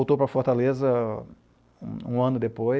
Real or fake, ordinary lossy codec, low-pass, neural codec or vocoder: real; none; none; none